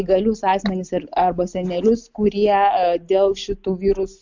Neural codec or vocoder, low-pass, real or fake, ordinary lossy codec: none; 7.2 kHz; real; MP3, 64 kbps